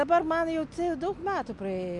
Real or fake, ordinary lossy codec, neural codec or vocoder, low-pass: real; MP3, 64 kbps; none; 10.8 kHz